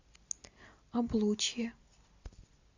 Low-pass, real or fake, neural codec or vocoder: 7.2 kHz; real; none